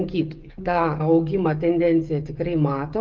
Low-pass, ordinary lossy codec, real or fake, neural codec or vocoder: 7.2 kHz; Opus, 32 kbps; fake; codec, 16 kHz, 16 kbps, FreqCodec, smaller model